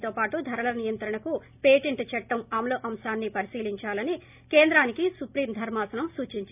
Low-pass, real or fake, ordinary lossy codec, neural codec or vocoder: 3.6 kHz; real; none; none